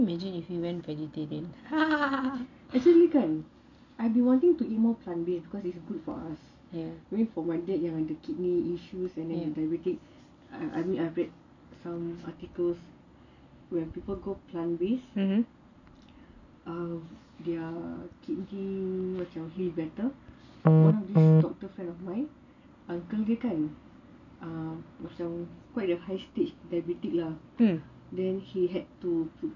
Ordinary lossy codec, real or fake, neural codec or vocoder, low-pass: none; real; none; 7.2 kHz